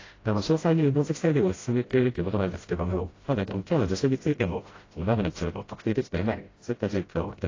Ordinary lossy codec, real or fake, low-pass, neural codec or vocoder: AAC, 32 kbps; fake; 7.2 kHz; codec, 16 kHz, 0.5 kbps, FreqCodec, smaller model